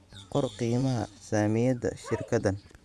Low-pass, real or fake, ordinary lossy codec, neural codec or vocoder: none; fake; none; vocoder, 24 kHz, 100 mel bands, Vocos